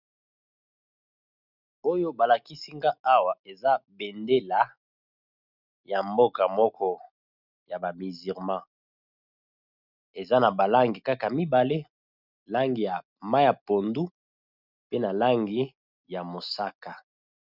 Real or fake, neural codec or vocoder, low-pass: real; none; 5.4 kHz